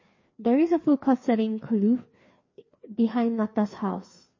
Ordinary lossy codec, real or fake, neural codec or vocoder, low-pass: MP3, 32 kbps; fake; codec, 16 kHz, 2 kbps, FreqCodec, larger model; 7.2 kHz